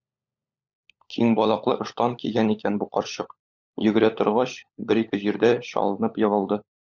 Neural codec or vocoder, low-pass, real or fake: codec, 16 kHz, 16 kbps, FunCodec, trained on LibriTTS, 50 frames a second; 7.2 kHz; fake